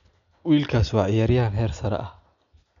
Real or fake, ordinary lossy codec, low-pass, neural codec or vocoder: real; none; 7.2 kHz; none